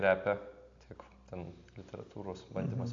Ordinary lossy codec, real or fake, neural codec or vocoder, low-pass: AAC, 48 kbps; real; none; 7.2 kHz